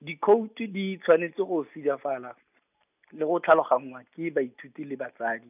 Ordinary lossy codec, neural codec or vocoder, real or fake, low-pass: none; none; real; 3.6 kHz